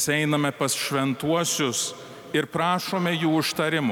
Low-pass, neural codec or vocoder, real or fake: 19.8 kHz; none; real